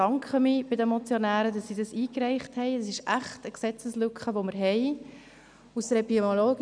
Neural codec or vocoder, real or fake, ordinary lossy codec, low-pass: none; real; none; 9.9 kHz